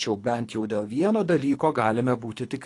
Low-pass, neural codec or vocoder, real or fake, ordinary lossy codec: 10.8 kHz; codec, 24 kHz, 3 kbps, HILCodec; fake; AAC, 48 kbps